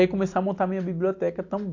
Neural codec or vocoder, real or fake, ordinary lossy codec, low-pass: none; real; AAC, 48 kbps; 7.2 kHz